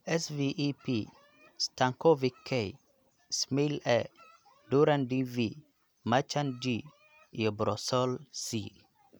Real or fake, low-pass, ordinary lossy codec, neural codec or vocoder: real; none; none; none